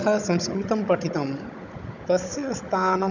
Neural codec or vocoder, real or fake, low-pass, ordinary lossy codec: codec, 16 kHz, 16 kbps, FunCodec, trained on Chinese and English, 50 frames a second; fake; 7.2 kHz; none